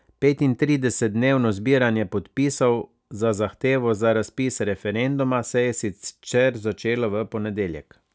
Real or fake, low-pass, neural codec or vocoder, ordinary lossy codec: real; none; none; none